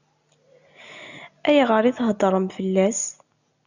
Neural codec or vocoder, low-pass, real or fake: none; 7.2 kHz; real